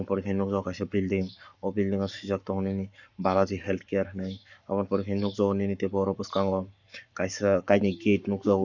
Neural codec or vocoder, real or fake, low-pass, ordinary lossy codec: codec, 44.1 kHz, 7.8 kbps, Pupu-Codec; fake; 7.2 kHz; none